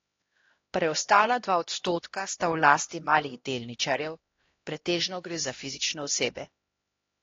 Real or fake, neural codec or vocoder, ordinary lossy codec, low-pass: fake; codec, 16 kHz, 1 kbps, X-Codec, HuBERT features, trained on LibriSpeech; AAC, 32 kbps; 7.2 kHz